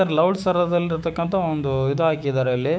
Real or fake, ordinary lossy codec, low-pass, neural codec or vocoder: fake; none; none; codec, 16 kHz, 6 kbps, DAC